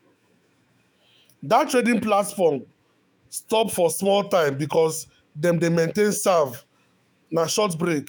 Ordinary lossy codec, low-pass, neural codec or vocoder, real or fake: none; none; autoencoder, 48 kHz, 128 numbers a frame, DAC-VAE, trained on Japanese speech; fake